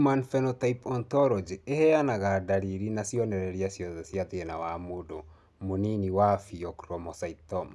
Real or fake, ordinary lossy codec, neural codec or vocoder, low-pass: real; none; none; none